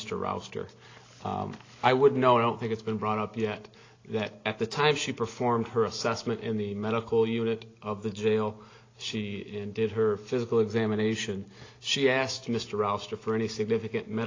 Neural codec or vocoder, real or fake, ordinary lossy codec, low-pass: none; real; AAC, 32 kbps; 7.2 kHz